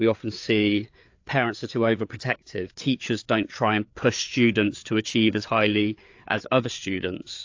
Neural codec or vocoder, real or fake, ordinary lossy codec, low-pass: codec, 16 kHz, 4 kbps, FreqCodec, larger model; fake; AAC, 48 kbps; 7.2 kHz